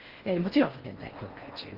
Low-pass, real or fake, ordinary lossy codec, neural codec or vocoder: 5.4 kHz; fake; Opus, 32 kbps; codec, 16 kHz in and 24 kHz out, 0.8 kbps, FocalCodec, streaming, 65536 codes